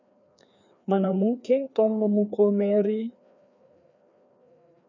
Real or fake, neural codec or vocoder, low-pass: fake; codec, 16 kHz, 2 kbps, FreqCodec, larger model; 7.2 kHz